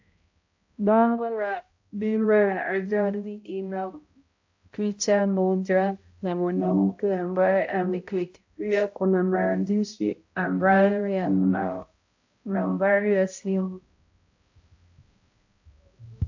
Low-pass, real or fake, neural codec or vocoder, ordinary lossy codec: 7.2 kHz; fake; codec, 16 kHz, 0.5 kbps, X-Codec, HuBERT features, trained on balanced general audio; AAC, 48 kbps